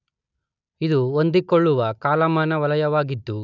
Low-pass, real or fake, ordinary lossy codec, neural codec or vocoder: 7.2 kHz; real; none; none